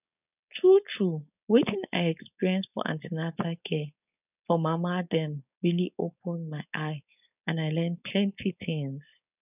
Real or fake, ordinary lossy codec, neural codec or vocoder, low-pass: fake; none; codec, 16 kHz, 4.8 kbps, FACodec; 3.6 kHz